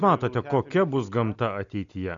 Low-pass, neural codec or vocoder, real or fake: 7.2 kHz; none; real